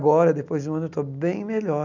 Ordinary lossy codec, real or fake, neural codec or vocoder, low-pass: none; real; none; 7.2 kHz